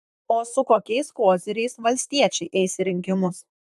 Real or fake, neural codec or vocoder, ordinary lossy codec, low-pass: fake; vocoder, 44.1 kHz, 128 mel bands, Pupu-Vocoder; AAC, 96 kbps; 14.4 kHz